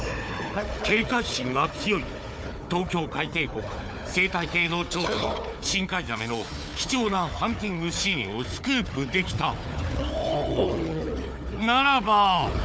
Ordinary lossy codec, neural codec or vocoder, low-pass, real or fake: none; codec, 16 kHz, 4 kbps, FunCodec, trained on Chinese and English, 50 frames a second; none; fake